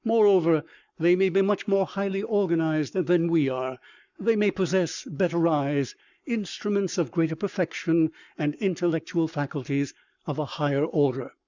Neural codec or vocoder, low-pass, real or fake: codec, 44.1 kHz, 7.8 kbps, Pupu-Codec; 7.2 kHz; fake